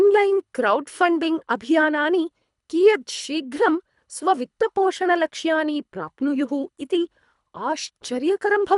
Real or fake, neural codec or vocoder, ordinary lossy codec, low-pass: fake; codec, 24 kHz, 3 kbps, HILCodec; none; 10.8 kHz